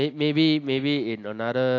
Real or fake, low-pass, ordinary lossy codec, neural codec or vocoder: real; 7.2 kHz; AAC, 48 kbps; none